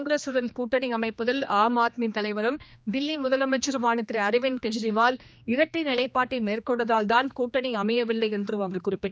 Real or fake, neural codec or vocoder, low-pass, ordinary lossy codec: fake; codec, 16 kHz, 2 kbps, X-Codec, HuBERT features, trained on general audio; none; none